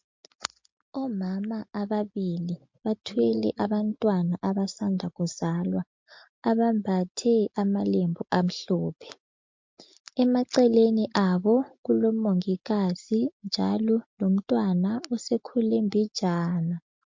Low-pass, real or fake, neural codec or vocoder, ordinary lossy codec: 7.2 kHz; real; none; MP3, 48 kbps